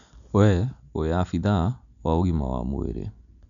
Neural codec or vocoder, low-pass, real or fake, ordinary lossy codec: none; 7.2 kHz; real; MP3, 96 kbps